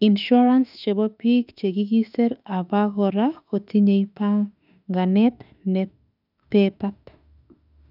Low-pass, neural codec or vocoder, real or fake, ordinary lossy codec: 5.4 kHz; autoencoder, 48 kHz, 32 numbers a frame, DAC-VAE, trained on Japanese speech; fake; none